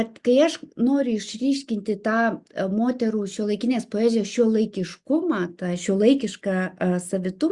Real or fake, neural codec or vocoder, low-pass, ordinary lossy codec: real; none; 10.8 kHz; Opus, 64 kbps